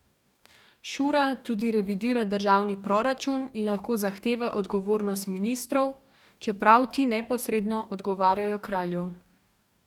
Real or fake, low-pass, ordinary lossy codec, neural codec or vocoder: fake; 19.8 kHz; none; codec, 44.1 kHz, 2.6 kbps, DAC